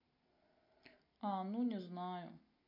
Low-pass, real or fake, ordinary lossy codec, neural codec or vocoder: 5.4 kHz; real; AAC, 24 kbps; none